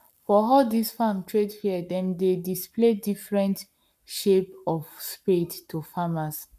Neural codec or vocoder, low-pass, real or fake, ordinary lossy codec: codec, 44.1 kHz, 7.8 kbps, Pupu-Codec; 14.4 kHz; fake; none